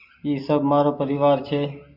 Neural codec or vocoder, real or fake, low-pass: none; real; 5.4 kHz